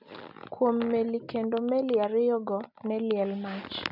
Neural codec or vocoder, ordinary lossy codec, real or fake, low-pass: none; none; real; 5.4 kHz